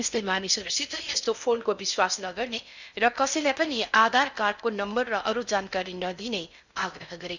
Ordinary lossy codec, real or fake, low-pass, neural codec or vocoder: none; fake; 7.2 kHz; codec, 16 kHz in and 24 kHz out, 0.6 kbps, FocalCodec, streaming, 2048 codes